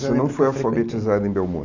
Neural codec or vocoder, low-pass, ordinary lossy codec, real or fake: none; 7.2 kHz; none; real